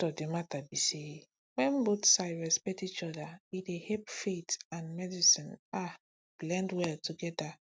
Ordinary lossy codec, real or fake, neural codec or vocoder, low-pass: none; real; none; none